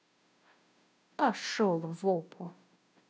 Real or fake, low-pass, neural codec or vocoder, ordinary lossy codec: fake; none; codec, 16 kHz, 0.5 kbps, FunCodec, trained on Chinese and English, 25 frames a second; none